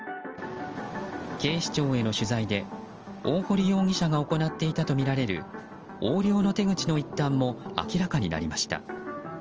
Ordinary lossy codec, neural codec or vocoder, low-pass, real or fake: Opus, 24 kbps; none; 7.2 kHz; real